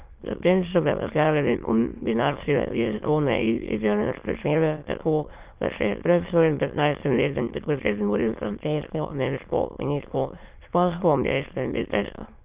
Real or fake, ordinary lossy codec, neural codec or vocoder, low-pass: fake; Opus, 32 kbps; autoencoder, 22.05 kHz, a latent of 192 numbers a frame, VITS, trained on many speakers; 3.6 kHz